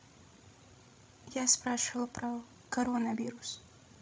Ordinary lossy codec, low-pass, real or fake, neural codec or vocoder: none; none; fake; codec, 16 kHz, 16 kbps, FreqCodec, larger model